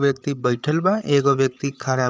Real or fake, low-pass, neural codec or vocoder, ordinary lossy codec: fake; none; codec, 16 kHz, 8 kbps, FreqCodec, larger model; none